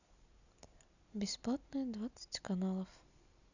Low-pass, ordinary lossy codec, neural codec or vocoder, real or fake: 7.2 kHz; none; none; real